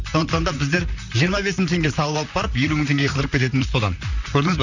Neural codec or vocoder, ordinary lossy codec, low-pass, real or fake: vocoder, 44.1 kHz, 128 mel bands, Pupu-Vocoder; none; 7.2 kHz; fake